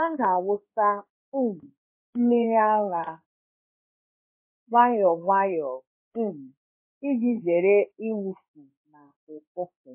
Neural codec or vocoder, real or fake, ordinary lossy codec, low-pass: codec, 16 kHz in and 24 kHz out, 1 kbps, XY-Tokenizer; fake; MP3, 24 kbps; 3.6 kHz